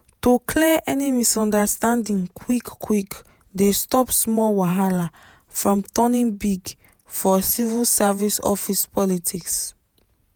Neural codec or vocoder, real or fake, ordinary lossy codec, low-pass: vocoder, 48 kHz, 128 mel bands, Vocos; fake; none; none